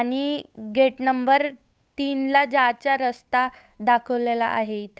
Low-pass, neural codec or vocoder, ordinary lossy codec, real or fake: none; codec, 16 kHz, 8 kbps, FunCodec, trained on Chinese and English, 25 frames a second; none; fake